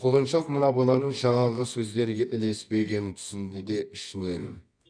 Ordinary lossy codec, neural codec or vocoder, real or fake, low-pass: none; codec, 24 kHz, 0.9 kbps, WavTokenizer, medium music audio release; fake; 9.9 kHz